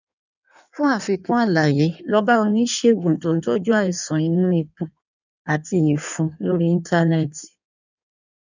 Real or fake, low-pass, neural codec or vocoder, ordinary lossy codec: fake; 7.2 kHz; codec, 16 kHz in and 24 kHz out, 1.1 kbps, FireRedTTS-2 codec; none